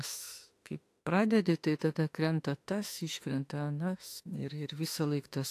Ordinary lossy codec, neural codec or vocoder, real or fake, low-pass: AAC, 64 kbps; autoencoder, 48 kHz, 32 numbers a frame, DAC-VAE, trained on Japanese speech; fake; 14.4 kHz